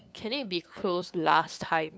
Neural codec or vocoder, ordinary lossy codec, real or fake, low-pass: codec, 16 kHz, 2 kbps, FunCodec, trained on LibriTTS, 25 frames a second; none; fake; none